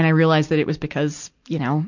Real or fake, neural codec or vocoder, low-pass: real; none; 7.2 kHz